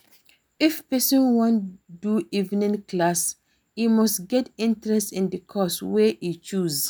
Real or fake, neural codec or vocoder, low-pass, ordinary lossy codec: real; none; none; none